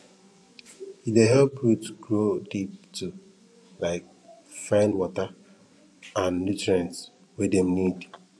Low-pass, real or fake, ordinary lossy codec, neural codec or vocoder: none; fake; none; vocoder, 24 kHz, 100 mel bands, Vocos